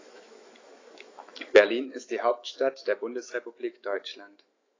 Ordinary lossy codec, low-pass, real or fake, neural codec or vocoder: AAC, 32 kbps; 7.2 kHz; fake; autoencoder, 48 kHz, 128 numbers a frame, DAC-VAE, trained on Japanese speech